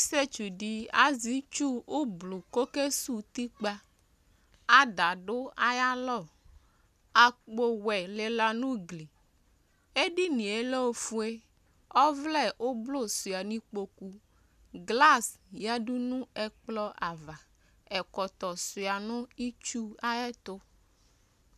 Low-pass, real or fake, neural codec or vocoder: 14.4 kHz; real; none